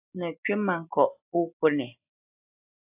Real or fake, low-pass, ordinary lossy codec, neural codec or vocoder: real; 3.6 kHz; AAC, 32 kbps; none